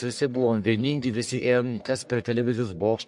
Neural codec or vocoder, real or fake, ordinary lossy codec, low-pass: codec, 44.1 kHz, 1.7 kbps, Pupu-Codec; fake; MP3, 64 kbps; 10.8 kHz